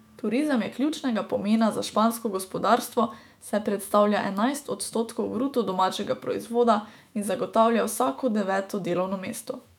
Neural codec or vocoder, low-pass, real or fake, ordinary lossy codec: autoencoder, 48 kHz, 128 numbers a frame, DAC-VAE, trained on Japanese speech; 19.8 kHz; fake; none